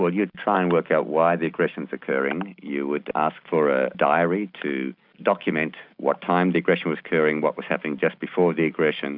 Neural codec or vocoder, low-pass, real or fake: none; 5.4 kHz; real